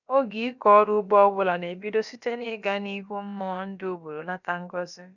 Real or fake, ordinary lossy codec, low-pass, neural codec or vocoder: fake; none; 7.2 kHz; codec, 16 kHz, about 1 kbps, DyCAST, with the encoder's durations